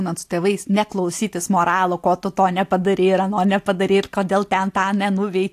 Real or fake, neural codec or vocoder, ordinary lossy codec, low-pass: real; none; AAC, 64 kbps; 14.4 kHz